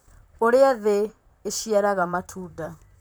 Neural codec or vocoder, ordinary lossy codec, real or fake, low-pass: vocoder, 44.1 kHz, 128 mel bands, Pupu-Vocoder; none; fake; none